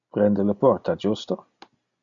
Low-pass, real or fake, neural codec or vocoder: 7.2 kHz; real; none